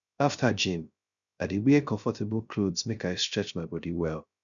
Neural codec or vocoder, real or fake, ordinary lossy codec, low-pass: codec, 16 kHz, 0.3 kbps, FocalCodec; fake; none; 7.2 kHz